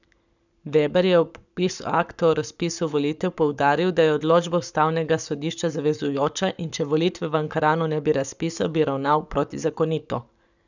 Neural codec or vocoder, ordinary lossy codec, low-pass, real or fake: codec, 44.1 kHz, 7.8 kbps, Pupu-Codec; none; 7.2 kHz; fake